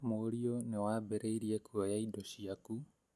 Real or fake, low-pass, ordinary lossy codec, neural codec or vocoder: real; none; none; none